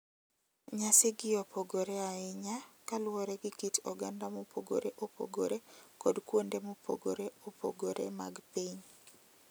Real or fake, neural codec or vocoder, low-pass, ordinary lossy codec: real; none; none; none